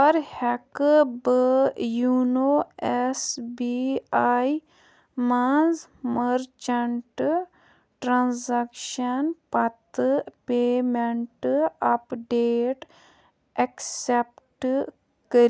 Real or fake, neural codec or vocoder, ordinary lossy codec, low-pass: real; none; none; none